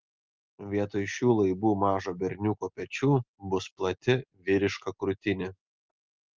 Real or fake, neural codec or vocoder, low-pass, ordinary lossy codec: real; none; 7.2 kHz; Opus, 32 kbps